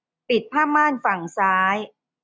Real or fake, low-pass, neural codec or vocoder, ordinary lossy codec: real; none; none; none